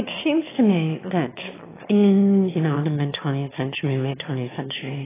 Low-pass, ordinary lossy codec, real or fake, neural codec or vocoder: 3.6 kHz; AAC, 16 kbps; fake; autoencoder, 22.05 kHz, a latent of 192 numbers a frame, VITS, trained on one speaker